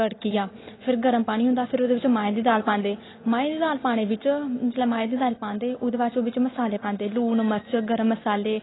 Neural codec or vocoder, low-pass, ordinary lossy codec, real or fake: none; 7.2 kHz; AAC, 16 kbps; real